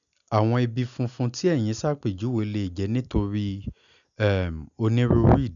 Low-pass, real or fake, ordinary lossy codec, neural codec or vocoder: 7.2 kHz; real; none; none